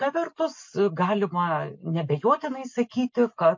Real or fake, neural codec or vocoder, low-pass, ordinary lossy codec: real; none; 7.2 kHz; MP3, 48 kbps